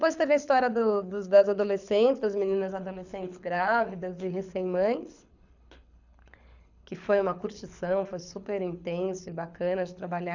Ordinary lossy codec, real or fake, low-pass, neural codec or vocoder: none; fake; 7.2 kHz; codec, 24 kHz, 6 kbps, HILCodec